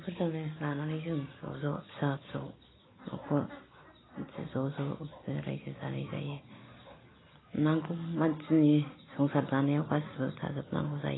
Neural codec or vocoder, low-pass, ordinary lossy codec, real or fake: none; 7.2 kHz; AAC, 16 kbps; real